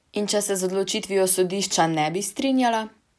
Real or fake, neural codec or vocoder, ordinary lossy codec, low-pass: real; none; none; none